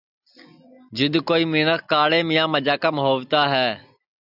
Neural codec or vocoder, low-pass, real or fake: none; 5.4 kHz; real